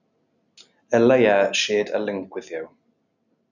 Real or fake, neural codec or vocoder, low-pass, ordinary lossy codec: real; none; 7.2 kHz; none